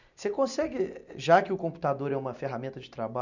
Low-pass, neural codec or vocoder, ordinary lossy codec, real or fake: 7.2 kHz; none; none; real